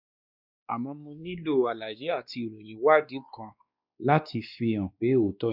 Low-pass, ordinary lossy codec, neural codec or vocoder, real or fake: 5.4 kHz; none; codec, 16 kHz, 2 kbps, X-Codec, WavLM features, trained on Multilingual LibriSpeech; fake